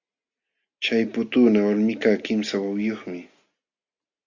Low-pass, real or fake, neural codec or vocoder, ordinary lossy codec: 7.2 kHz; real; none; Opus, 64 kbps